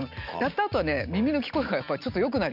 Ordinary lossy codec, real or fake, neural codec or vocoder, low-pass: none; real; none; 5.4 kHz